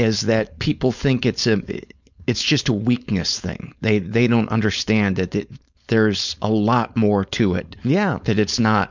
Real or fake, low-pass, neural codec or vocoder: fake; 7.2 kHz; codec, 16 kHz, 4.8 kbps, FACodec